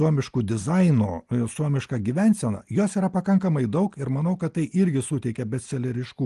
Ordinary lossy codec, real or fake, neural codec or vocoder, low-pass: Opus, 24 kbps; real; none; 10.8 kHz